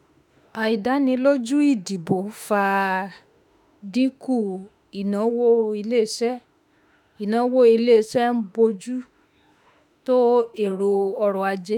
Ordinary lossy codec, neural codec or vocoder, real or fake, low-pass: none; autoencoder, 48 kHz, 32 numbers a frame, DAC-VAE, trained on Japanese speech; fake; 19.8 kHz